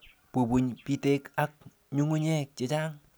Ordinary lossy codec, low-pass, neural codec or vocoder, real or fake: none; none; none; real